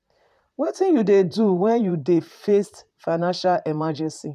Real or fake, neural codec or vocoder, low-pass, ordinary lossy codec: fake; vocoder, 44.1 kHz, 128 mel bands, Pupu-Vocoder; 14.4 kHz; none